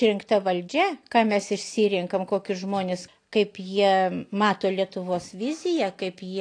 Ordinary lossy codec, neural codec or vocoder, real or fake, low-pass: AAC, 48 kbps; none; real; 9.9 kHz